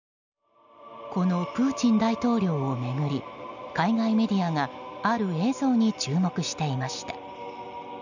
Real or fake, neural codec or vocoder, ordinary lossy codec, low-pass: real; none; none; 7.2 kHz